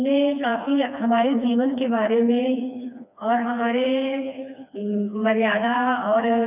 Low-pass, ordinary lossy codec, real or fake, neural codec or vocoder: 3.6 kHz; none; fake; codec, 16 kHz, 2 kbps, FreqCodec, smaller model